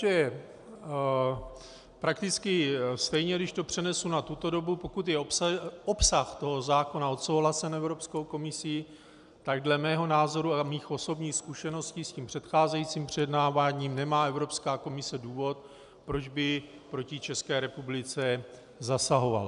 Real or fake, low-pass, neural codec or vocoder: real; 10.8 kHz; none